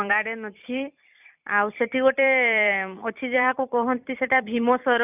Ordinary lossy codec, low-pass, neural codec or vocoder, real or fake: none; 3.6 kHz; vocoder, 44.1 kHz, 128 mel bands every 256 samples, BigVGAN v2; fake